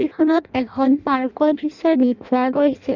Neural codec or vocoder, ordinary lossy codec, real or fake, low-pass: codec, 16 kHz in and 24 kHz out, 0.6 kbps, FireRedTTS-2 codec; none; fake; 7.2 kHz